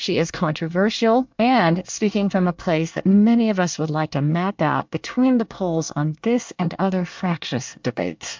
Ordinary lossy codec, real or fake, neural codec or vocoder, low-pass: MP3, 64 kbps; fake; codec, 24 kHz, 1 kbps, SNAC; 7.2 kHz